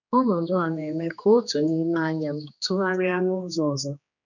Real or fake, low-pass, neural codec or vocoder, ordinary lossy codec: fake; 7.2 kHz; codec, 16 kHz, 2 kbps, X-Codec, HuBERT features, trained on general audio; none